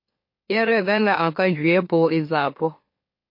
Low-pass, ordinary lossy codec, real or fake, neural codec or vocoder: 5.4 kHz; MP3, 32 kbps; fake; autoencoder, 44.1 kHz, a latent of 192 numbers a frame, MeloTTS